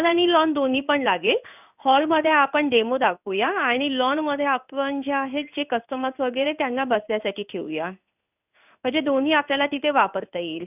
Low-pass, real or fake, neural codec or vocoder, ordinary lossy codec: 3.6 kHz; fake; codec, 16 kHz in and 24 kHz out, 1 kbps, XY-Tokenizer; none